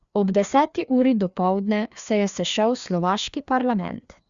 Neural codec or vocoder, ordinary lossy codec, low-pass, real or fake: codec, 16 kHz, 2 kbps, FreqCodec, larger model; Opus, 64 kbps; 7.2 kHz; fake